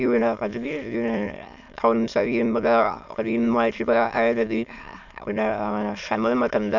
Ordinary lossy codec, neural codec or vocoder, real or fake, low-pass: none; autoencoder, 22.05 kHz, a latent of 192 numbers a frame, VITS, trained on many speakers; fake; 7.2 kHz